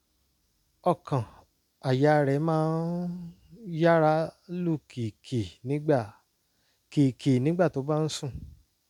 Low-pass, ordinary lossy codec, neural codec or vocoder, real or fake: 19.8 kHz; none; none; real